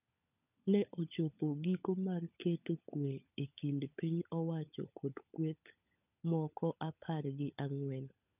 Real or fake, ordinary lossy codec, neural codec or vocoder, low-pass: fake; none; codec, 16 kHz, 16 kbps, FunCodec, trained on LibriTTS, 50 frames a second; 3.6 kHz